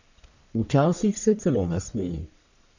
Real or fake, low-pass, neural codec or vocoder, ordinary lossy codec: fake; 7.2 kHz; codec, 44.1 kHz, 1.7 kbps, Pupu-Codec; none